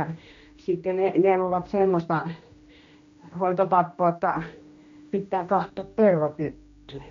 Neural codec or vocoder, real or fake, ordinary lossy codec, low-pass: codec, 16 kHz, 1 kbps, X-Codec, HuBERT features, trained on general audio; fake; MP3, 48 kbps; 7.2 kHz